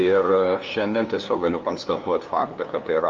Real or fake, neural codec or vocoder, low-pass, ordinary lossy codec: fake; codec, 16 kHz, 2 kbps, FunCodec, trained on LibriTTS, 25 frames a second; 7.2 kHz; Opus, 32 kbps